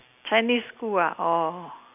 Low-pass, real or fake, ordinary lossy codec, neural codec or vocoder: 3.6 kHz; real; none; none